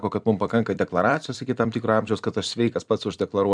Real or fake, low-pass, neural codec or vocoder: fake; 9.9 kHz; vocoder, 44.1 kHz, 128 mel bands every 256 samples, BigVGAN v2